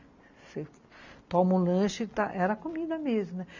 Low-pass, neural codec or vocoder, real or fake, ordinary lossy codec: 7.2 kHz; none; real; MP3, 64 kbps